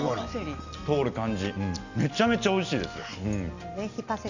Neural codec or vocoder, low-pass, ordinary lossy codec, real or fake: none; 7.2 kHz; none; real